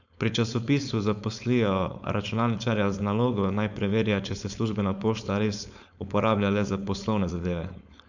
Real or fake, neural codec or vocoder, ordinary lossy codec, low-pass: fake; codec, 16 kHz, 4.8 kbps, FACodec; none; 7.2 kHz